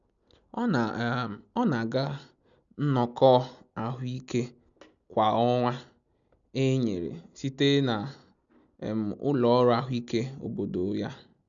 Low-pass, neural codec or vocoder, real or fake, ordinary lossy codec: 7.2 kHz; none; real; none